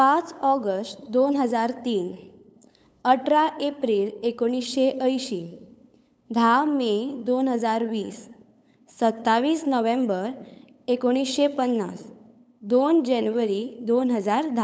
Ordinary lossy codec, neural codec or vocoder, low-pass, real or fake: none; codec, 16 kHz, 8 kbps, FunCodec, trained on LibriTTS, 25 frames a second; none; fake